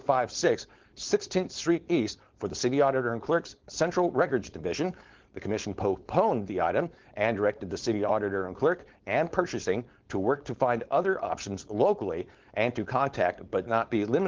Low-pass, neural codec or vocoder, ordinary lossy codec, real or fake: 7.2 kHz; codec, 16 kHz, 4.8 kbps, FACodec; Opus, 16 kbps; fake